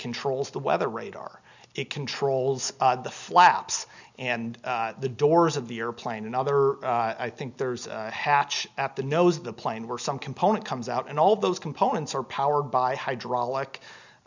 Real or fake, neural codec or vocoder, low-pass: real; none; 7.2 kHz